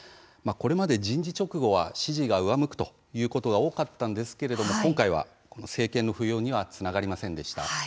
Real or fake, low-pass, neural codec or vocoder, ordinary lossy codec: real; none; none; none